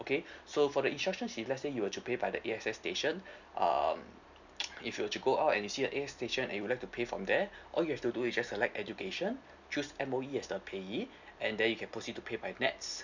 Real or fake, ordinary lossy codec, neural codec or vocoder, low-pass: real; none; none; 7.2 kHz